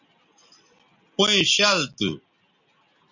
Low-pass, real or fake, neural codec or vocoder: 7.2 kHz; real; none